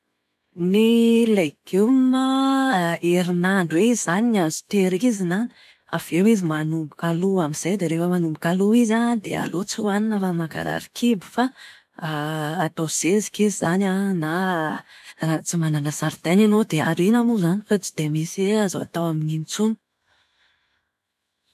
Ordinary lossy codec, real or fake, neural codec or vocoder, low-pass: none; fake; autoencoder, 48 kHz, 128 numbers a frame, DAC-VAE, trained on Japanese speech; 14.4 kHz